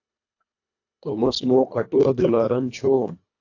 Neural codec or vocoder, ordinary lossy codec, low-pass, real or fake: codec, 24 kHz, 1.5 kbps, HILCodec; AAC, 48 kbps; 7.2 kHz; fake